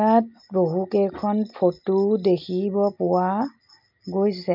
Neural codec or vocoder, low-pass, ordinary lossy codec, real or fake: none; 5.4 kHz; MP3, 48 kbps; real